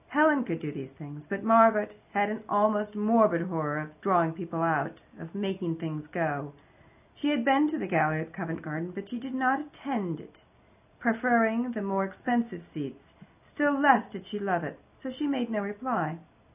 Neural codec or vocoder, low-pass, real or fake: none; 3.6 kHz; real